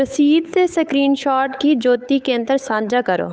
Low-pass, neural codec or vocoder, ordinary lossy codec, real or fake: none; codec, 16 kHz, 8 kbps, FunCodec, trained on Chinese and English, 25 frames a second; none; fake